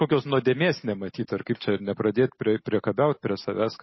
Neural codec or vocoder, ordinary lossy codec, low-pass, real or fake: none; MP3, 24 kbps; 7.2 kHz; real